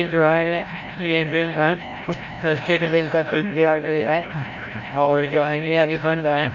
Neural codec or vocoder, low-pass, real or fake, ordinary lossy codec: codec, 16 kHz, 0.5 kbps, FreqCodec, larger model; 7.2 kHz; fake; none